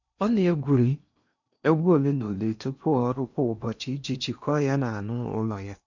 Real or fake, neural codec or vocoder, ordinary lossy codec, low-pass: fake; codec, 16 kHz in and 24 kHz out, 0.8 kbps, FocalCodec, streaming, 65536 codes; none; 7.2 kHz